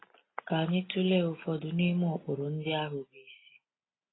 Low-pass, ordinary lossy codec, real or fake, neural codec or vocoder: 7.2 kHz; AAC, 16 kbps; real; none